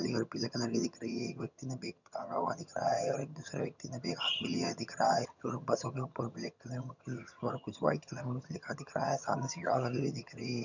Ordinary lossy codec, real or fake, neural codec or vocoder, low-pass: none; fake; vocoder, 22.05 kHz, 80 mel bands, HiFi-GAN; 7.2 kHz